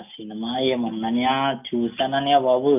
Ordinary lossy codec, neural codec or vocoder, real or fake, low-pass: none; none; real; 3.6 kHz